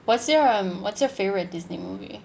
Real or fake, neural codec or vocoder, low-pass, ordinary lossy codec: real; none; none; none